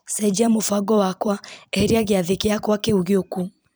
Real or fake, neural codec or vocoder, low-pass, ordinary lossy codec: fake; vocoder, 44.1 kHz, 128 mel bands every 256 samples, BigVGAN v2; none; none